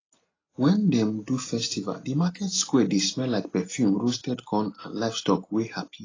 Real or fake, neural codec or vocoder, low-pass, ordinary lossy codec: real; none; 7.2 kHz; AAC, 32 kbps